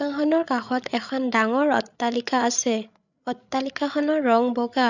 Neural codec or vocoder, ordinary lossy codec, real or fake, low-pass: codec, 16 kHz, 8 kbps, FreqCodec, larger model; none; fake; 7.2 kHz